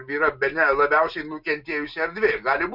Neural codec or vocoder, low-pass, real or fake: none; 5.4 kHz; real